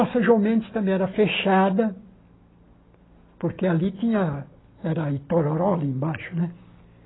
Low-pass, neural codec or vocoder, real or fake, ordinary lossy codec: 7.2 kHz; none; real; AAC, 16 kbps